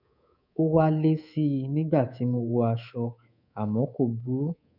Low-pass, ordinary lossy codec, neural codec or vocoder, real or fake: 5.4 kHz; none; codec, 24 kHz, 3.1 kbps, DualCodec; fake